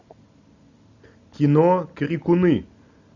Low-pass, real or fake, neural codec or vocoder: 7.2 kHz; real; none